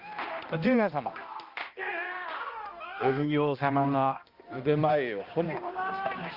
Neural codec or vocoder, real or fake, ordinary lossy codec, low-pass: codec, 16 kHz, 1 kbps, X-Codec, HuBERT features, trained on balanced general audio; fake; Opus, 24 kbps; 5.4 kHz